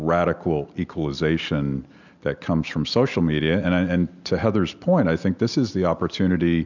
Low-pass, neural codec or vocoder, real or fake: 7.2 kHz; none; real